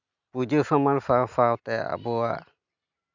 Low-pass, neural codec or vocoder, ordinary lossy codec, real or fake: 7.2 kHz; none; none; real